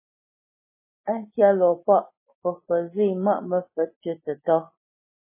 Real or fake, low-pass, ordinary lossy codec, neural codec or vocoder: real; 3.6 kHz; MP3, 16 kbps; none